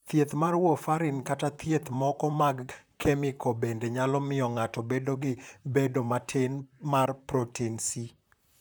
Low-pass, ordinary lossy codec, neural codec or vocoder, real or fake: none; none; vocoder, 44.1 kHz, 128 mel bands, Pupu-Vocoder; fake